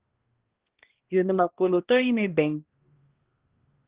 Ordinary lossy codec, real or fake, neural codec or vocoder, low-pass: Opus, 16 kbps; fake; codec, 16 kHz, 1 kbps, X-Codec, HuBERT features, trained on balanced general audio; 3.6 kHz